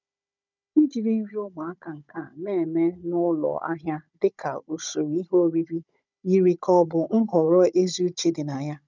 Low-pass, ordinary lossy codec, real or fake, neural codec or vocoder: 7.2 kHz; none; fake; codec, 16 kHz, 16 kbps, FunCodec, trained on Chinese and English, 50 frames a second